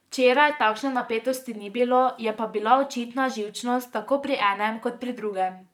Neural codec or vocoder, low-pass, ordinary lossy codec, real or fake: vocoder, 44.1 kHz, 128 mel bands, Pupu-Vocoder; 19.8 kHz; none; fake